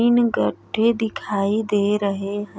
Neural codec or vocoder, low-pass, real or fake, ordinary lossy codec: none; none; real; none